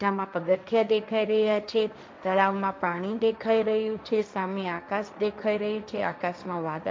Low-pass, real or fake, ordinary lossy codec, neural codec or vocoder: none; fake; none; codec, 16 kHz, 1.1 kbps, Voila-Tokenizer